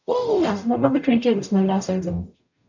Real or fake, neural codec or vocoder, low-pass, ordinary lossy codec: fake; codec, 44.1 kHz, 0.9 kbps, DAC; 7.2 kHz; none